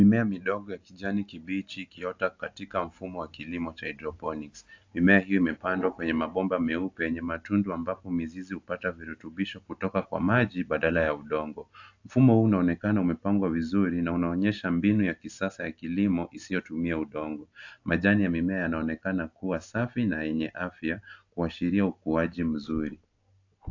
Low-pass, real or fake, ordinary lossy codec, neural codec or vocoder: 7.2 kHz; real; AAC, 48 kbps; none